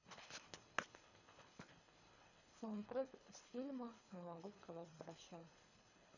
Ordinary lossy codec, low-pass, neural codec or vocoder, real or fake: none; 7.2 kHz; codec, 24 kHz, 3 kbps, HILCodec; fake